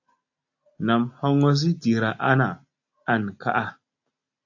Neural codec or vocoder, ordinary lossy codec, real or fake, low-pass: none; AAC, 48 kbps; real; 7.2 kHz